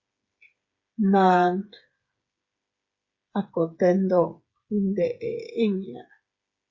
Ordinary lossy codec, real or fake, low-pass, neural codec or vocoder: Opus, 64 kbps; fake; 7.2 kHz; codec, 16 kHz, 8 kbps, FreqCodec, smaller model